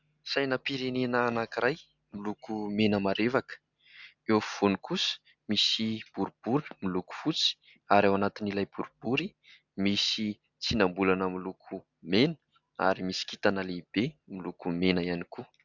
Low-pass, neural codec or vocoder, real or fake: 7.2 kHz; none; real